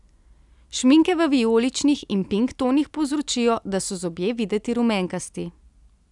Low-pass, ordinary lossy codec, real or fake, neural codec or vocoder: 10.8 kHz; none; real; none